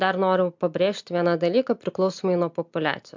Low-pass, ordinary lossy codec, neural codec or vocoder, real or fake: 7.2 kHz; MP3, 64 kbps; none; real